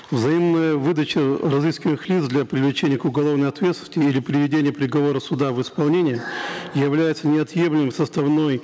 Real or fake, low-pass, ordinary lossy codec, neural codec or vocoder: real; none; none; none